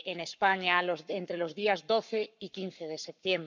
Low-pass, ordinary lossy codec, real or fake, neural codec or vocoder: 7.2 kHz; none; fake; codec, 44.1 kHz, 7.8 kbps, Pupu-Codec